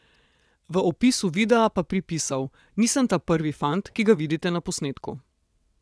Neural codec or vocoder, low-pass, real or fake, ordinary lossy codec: vocoder, 22.05 kHz, 80 mel bands, WaveNeXt; none; fake; none